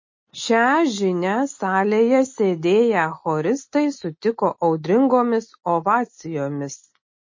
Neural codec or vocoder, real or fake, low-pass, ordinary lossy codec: none; real; 7.2 kHz; MP3, 32 kbps